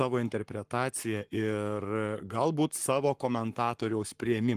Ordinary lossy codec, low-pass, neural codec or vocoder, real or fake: Opus, 24 kbps; 14.4 kHz; codec, 44.1 kHz, 7.8 kbps, Pupu-Codec; fake